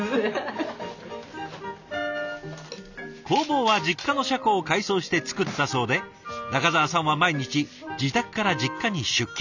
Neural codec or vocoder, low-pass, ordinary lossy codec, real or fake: none; 7.2 kHz; none; real